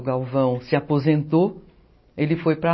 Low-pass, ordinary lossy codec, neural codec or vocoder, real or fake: 7.2 kHz; MP3, 24 kbps; none; real